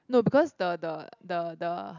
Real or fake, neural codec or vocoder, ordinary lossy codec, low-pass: real; none; none; 7.2 kHz